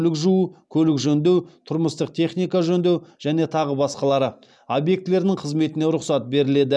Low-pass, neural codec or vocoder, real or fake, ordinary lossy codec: none; none; real; none